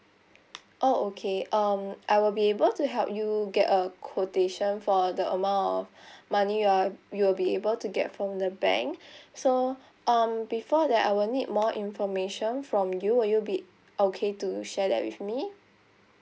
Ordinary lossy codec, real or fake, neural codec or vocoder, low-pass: none; real; none; none